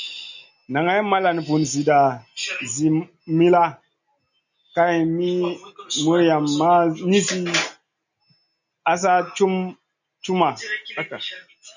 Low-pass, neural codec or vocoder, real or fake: 7.2 kHz; none; real